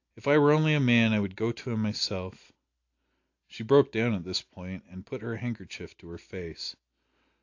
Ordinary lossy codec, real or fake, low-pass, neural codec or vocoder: AAC, 48 kbps; real; 7.2 kHz; none